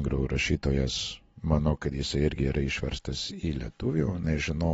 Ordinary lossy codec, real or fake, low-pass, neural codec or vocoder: AAC, 24 kbps; real; 19.8 kHz; none